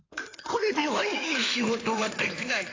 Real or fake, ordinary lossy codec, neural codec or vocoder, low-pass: fake; AAC, 32 kbps; codec, 16 kHz in and 24 kHz out, 2.2 kbps, FireRedTTS-2 codec; 7.2 kHz